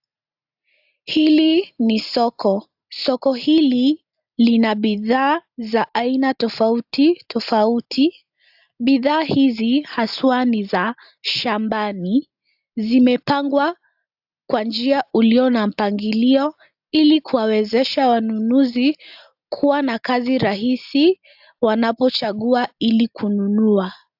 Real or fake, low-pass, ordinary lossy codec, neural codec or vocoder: real; 5.4 kHz; AAC, 48 kbps; none